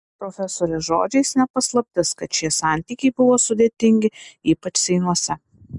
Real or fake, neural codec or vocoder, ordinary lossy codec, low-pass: real; none; MP3, 96 kbps; 10.8 kHz